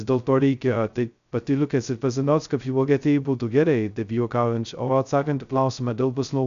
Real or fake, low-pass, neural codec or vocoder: fake; 7.2 kHz; codec, 16 kHz, 0.2 kbps, FocalCodec